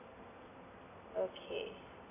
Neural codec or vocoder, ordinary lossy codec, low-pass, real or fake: none; AAC, 16 kbps; 3.6 kHz; real